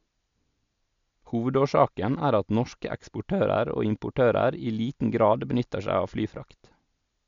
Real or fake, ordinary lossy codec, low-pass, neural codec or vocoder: real; MP3, 64 kbps; 7.2 kHz; none